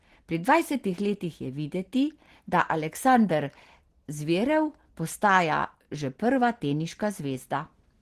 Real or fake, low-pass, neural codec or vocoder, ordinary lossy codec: real; 14.4 kHz; none; Opus, 16 kbps